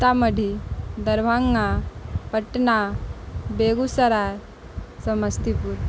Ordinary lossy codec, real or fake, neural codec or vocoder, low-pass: none; real; none; none